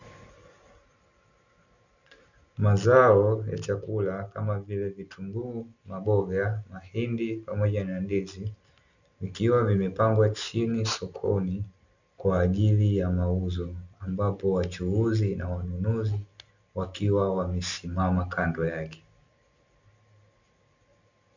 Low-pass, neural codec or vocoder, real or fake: 7.2 kHz; none; real